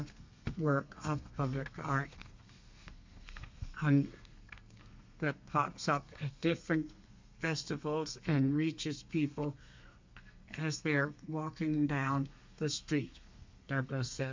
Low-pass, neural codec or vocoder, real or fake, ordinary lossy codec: 7.2 kHz; codec, 32 kHz, 1.9 kbps, SNAC; fake; MP3, 64 kbps